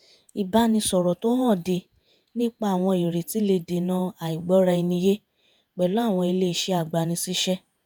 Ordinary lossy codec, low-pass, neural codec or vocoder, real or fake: none; none; vocoder, 48 kHz, 128 mel bands, Vocos; fake